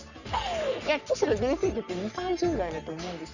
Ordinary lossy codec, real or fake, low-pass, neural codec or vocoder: none; fake; 7.2 kHz; codec, 44.1 kHz, 3.4 kbps, Pupu-Codec